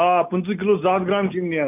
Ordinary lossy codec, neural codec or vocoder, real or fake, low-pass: none; none; real; 3.6 kHz